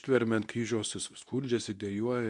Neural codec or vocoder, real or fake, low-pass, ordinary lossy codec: codec, 24 kHz, 0.9 kbps, WavTokenizer, medium speech release version 2; fake; 10.8 kHz; MP3, 96 kbps